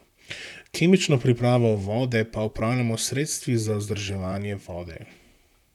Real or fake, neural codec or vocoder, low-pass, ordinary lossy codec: fake; vocoder, 44.1 kHz, 128 mel bands, Pupu-Vocoder; 19.8 kHz; none